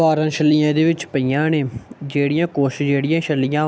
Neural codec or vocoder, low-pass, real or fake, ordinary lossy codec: none; none; real; none